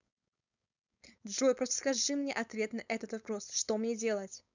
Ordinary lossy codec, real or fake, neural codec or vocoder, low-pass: none; fake; codec, 16 kHz, 4.8 kbps, FACodec; 7.2 kHz